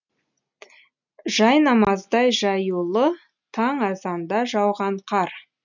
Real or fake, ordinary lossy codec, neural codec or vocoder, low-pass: real; none; none; 7.2 kHz